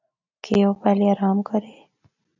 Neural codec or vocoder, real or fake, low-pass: none; real; 7.2 kHz